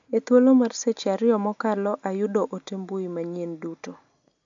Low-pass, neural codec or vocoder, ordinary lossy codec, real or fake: 7.2 kHz; none; AAC, 64 kbps; real